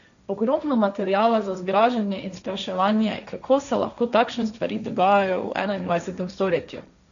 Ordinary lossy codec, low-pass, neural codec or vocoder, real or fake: none; 7.2 kHz; codec, 16 kHz, 1.1 kbps, Voila-Tokenizer; fake